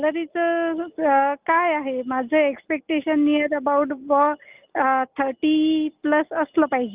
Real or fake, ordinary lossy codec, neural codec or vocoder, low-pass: real; Opus, 32 kbps; none; 3.6 kHz